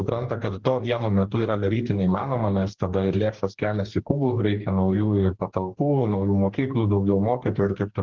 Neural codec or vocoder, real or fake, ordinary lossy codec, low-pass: codec, 44.1 kHz, 2.6 kbps, DAC; fake; Opus, 16 kbps; 7.2 kHz